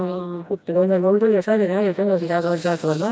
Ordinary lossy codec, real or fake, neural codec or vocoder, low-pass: none; fake; codec, 16 kHz, 1 kbps, FreqCodec, smaller model; none